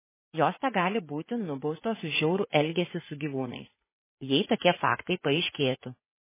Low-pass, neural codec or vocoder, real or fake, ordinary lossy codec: 3.6 kHz; vocoder, 44.1 kHz, 80 mel bands, Vocos; fake; MP3, 16 kbps